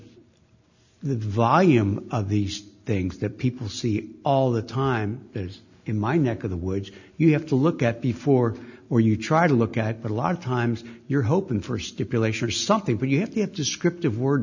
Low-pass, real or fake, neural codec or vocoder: 7.2 kHz; real; none